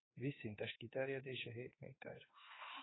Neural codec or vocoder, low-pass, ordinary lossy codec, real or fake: autoencoder, 48 kHz, 32 numbers a frame, DAC-VAE, trained on Japanese speech; 7.2 kHz; AAC, 16 kbps; fake